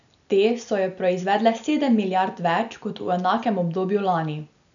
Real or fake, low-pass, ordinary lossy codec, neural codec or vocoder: real; 7.2 kHz; none; none